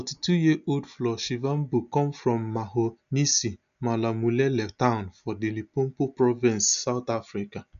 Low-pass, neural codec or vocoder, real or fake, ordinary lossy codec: 7.2 kHz; none; real; MP3, 96 kbps